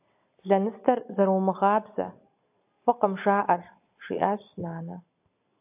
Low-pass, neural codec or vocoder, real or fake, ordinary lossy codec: 3.6 kHz; none; real; AAC, 32 kbps